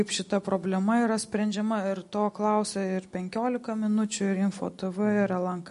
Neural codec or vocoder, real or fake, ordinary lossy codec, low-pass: none; real; MP3, 48 kbps; 10.8 kHz